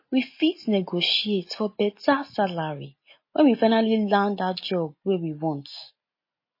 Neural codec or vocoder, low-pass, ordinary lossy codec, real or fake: none; 5.4 kHz; MP3, 24 kbps; real